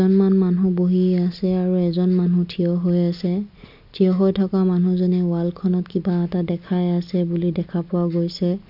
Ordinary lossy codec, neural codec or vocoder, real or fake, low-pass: none; none; real; 5.4 kHz